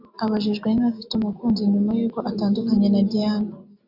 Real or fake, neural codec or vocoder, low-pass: real; none; 5.4 kHz